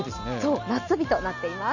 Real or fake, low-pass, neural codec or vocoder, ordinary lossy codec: real; 7.2 kHz; none; none